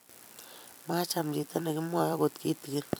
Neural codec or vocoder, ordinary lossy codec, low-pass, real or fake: vocoder, 44.1 kHz, 128 mel bands every 256 samples, BigVGAN v2; none; none; fake